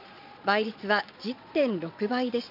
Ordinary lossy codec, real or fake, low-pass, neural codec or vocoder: none; fake; 5.4 kHz; vocoder, 22.05 kHz, 80 mel bands, Vocos